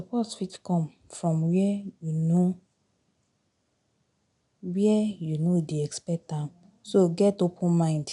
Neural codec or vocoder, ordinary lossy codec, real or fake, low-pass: none; none; real; 10.8 kHz